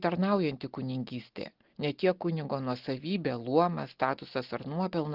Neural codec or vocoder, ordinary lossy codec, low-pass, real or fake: none; Opus, 16 kbps; 5.4 kHz; real